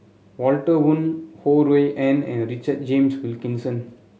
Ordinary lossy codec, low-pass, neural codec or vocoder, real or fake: none; none; none; real